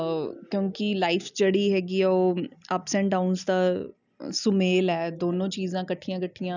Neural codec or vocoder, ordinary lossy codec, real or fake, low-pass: none; none; real; 7.2 kHz